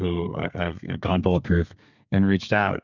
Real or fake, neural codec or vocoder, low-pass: fake; codec, 32 kHz, 1.9 kbps, SNAC; 7.2 kHz